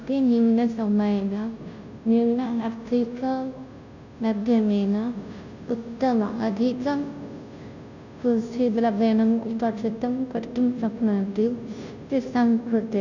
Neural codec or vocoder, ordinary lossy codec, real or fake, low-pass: codec, 16 kHz, 0.5 kbps, FunCodec, trained on Chinese and English, 25 frames a second; none; fake; 7.2 kHz